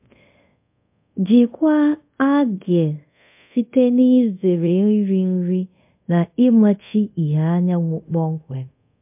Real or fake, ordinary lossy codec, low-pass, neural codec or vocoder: fake; MP3, 32 kbps; 3.6 kHz; codec, 24 kHz, 0.5 kbps, DualCodec